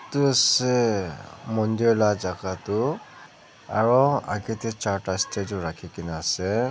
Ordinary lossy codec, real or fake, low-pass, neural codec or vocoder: none; real; none; none